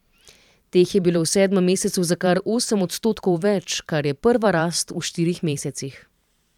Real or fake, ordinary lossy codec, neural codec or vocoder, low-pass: fake; none; vocoder, 44.1 kHz, 128 mel bands every 512 samples, BigVGAN v2; 19.8 kHz